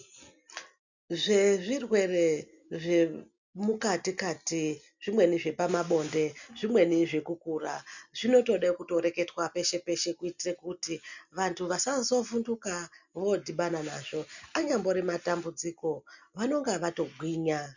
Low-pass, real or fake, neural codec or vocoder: 7.2 kHz; real; none